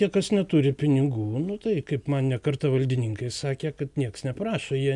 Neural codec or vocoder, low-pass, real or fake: none; 10.8 kHz; real